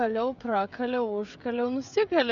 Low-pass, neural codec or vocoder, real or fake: 7.2 kHz; codec, 16 kHz, 16 kbps, FreqCodec, smaller model; fake